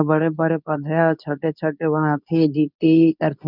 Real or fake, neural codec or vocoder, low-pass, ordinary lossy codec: fake; codec, 24 kHz, 0.9 kbps, WavTokenizer, medium speech release version 2; 5.4 kHz; none